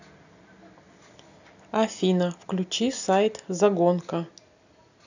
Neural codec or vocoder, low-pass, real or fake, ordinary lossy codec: none; 7.2 kHz; real; none